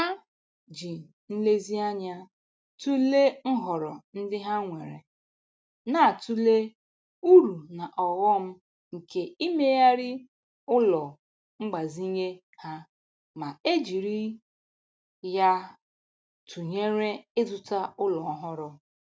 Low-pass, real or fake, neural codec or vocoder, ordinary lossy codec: none; real; none; none